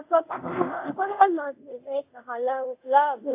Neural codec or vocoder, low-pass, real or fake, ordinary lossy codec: codec, 24 kHz, 0.5 kbps, DualCodec; 3.6 kHz; fake; AAC, 32 kbps